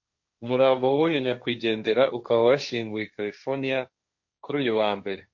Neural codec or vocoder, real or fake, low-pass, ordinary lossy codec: codec, 16 kHz, 1.1 kbps, Voila-Tokenizer; fake; 7.2 kHz; MP3, 48 kbps